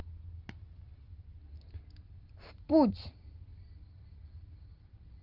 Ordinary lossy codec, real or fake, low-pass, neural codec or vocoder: Opus, 24 kbps; real; 5.4 kHz; none